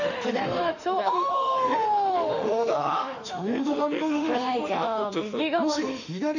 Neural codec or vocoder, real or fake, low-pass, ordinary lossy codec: autoencoder, 48 kHz, 32 numbers a frame, DAC-VAE, trained on Japanese speech; fake; 7.2 kHz; none